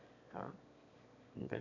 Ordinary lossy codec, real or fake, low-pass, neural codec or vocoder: none; fake; 7.2 kHz; autoencoder, 22.05 kHz, a latent of 192 numbers a frame, VITS, trained on one speaker